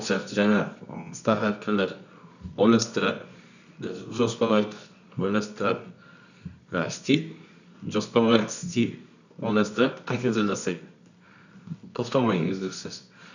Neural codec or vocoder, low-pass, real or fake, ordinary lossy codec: codec, 24 kHz, 0.9 kbps, WavTokenizer, medium music audio release; 7.2 kHz; fake; none